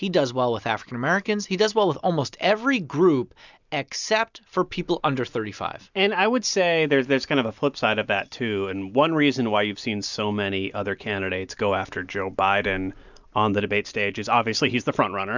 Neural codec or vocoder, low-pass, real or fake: none; 7.2 kHz; real